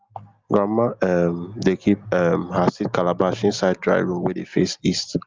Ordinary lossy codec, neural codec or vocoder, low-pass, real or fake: Opus, 32 kbps; none; 7.2 kHz; real